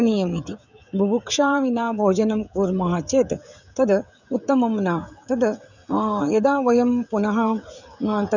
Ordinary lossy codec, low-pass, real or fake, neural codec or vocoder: none; 7.2 kHz; fake; codec, 16 kHz, 16 kbps, FreqCodec, smaller model